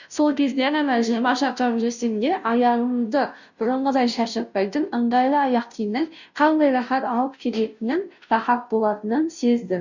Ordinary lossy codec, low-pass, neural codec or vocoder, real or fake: none; 7.2 kHz; codec, 16 kHz, 0.5 kbps, FunCodec, trained on Chinese and English, 25 frames a second; fake